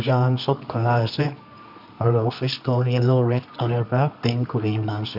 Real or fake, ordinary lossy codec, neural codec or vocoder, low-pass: fake; none; codec, 24 kHz, 0.9 kbps, WavTokenizer, medium music audio release; 5.4 kHz